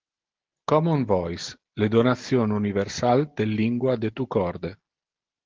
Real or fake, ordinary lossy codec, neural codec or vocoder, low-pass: real; Opus, 16 kbps; none; 7.2 kHz